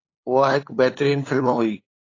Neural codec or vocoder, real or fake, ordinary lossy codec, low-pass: codec, 16 kHz, 8 kbps, FunCodec, trained on LibriTTS, 25 frames a second; fake; AAC, 32 kbps; 7.2 kHz